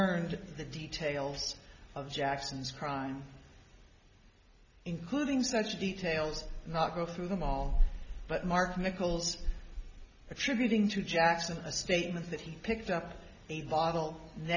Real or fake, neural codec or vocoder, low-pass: real; none; 7.2 kHz